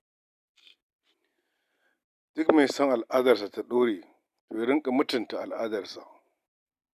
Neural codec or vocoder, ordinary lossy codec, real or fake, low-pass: none; none; real; 14.4 kHz